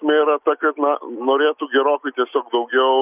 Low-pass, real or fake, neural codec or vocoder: 3.6 kHz; real; none